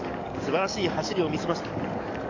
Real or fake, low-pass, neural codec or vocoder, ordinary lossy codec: fake; 7.2 kHz; codec, 44.1 kHz, 7.8 kbps, DAC; none